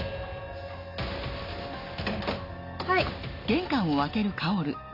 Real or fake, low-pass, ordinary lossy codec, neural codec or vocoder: real; 5.4 kHz; none; none